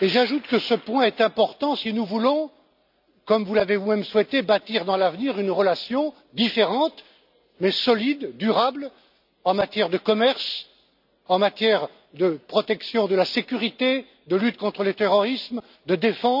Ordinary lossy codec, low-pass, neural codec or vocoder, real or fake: none; 5.4 kHz; none; real